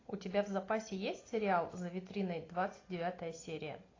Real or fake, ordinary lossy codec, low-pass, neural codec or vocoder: real; AAC, 32 kbps; 7.2 kHz; none